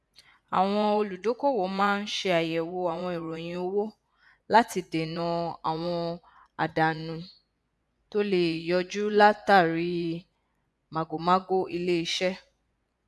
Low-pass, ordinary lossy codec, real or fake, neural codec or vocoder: none; none; fake; vocoder, 24 kHz, 100 mel bands, Vocos